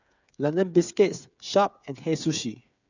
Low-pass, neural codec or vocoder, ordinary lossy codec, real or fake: 7.2 kHz; codec, 16 kHz, 8 kbps, FreqCodec, smaller model; none; fake